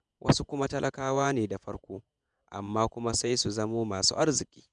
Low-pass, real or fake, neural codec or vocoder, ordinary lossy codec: 10.8 kHz; fake; vocoder, 48 kHz, 128 mel bands, Vocos; none